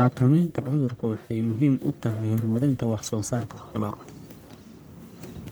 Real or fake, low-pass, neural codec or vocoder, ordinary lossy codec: fake; none; codec, 44.1 kHz, 1.7 kbps, Pupu-Codec; none